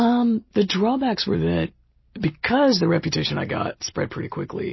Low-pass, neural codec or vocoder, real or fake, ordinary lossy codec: 7.2 kHz; none; real; MP3, 24 kbps